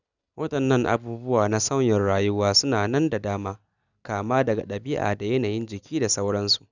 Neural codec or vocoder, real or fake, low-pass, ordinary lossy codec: none; real; 7.2 kHz; none